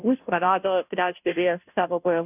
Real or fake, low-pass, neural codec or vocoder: fake; 3.6 kHz; codec, 16 kHz, 0.5 kbps, FunCodec, trained on Chinese and English, 25 frames a second